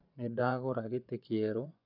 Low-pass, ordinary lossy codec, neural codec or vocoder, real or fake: 5.4 kHz; none; vocoder, 24 kHz, 100 mel bands, Vocos; fake